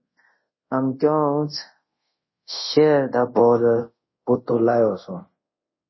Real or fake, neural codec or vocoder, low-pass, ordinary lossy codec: fake; codec, 24 kHz, 0.5 kbps, DualCodec; 7.2 kHz; MP3, 24 kbps